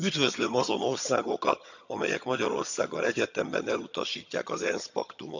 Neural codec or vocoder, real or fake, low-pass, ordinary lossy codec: vocoder, 22.05 kHz, 80 mel bands, HiFi-GAN; fake; 7.2 kHz; none